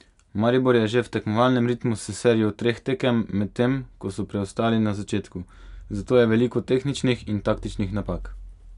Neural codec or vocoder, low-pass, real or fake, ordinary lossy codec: none; 10.8 kHz; real; none